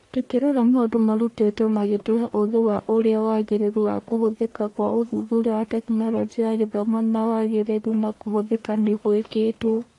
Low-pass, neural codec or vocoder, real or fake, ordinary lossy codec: 10.8 kHz; codec, 44.1 kHz, 1.7 kbps, Pupu-Codec; fake; AAC, 48 kbps